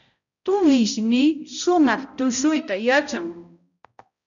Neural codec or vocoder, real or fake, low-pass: codec, 16 kHz, 0.5 kbps, X-Codec, HuBERT features, trained on balanced general audio; fake; 7.2 kHz